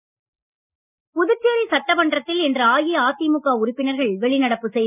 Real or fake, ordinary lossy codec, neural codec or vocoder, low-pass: real; none; none; 3.6 kHz